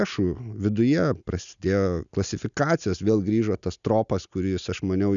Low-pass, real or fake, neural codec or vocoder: 7.2 kHz; real; none